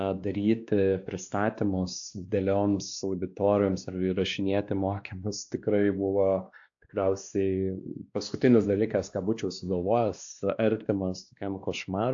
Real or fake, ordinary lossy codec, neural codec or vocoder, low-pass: fake; AAC, 64 kbps; codec, 16 kHz, 2 kbps, X-Codec, WavLM features, trained on Multilingual LibriSpeech; 7.2 kHz